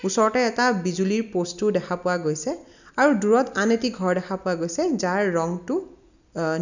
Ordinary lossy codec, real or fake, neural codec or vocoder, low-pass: none; real; none; 7.2 kHz